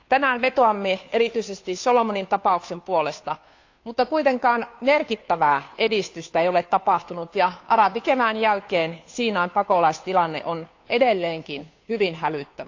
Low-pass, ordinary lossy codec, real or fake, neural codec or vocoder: 7.2 kHz; AAC, 48 kbps; fake; codec, 16 kHz, 2 kbps, FunCodec, trained on Chinese and English, 25 frames a second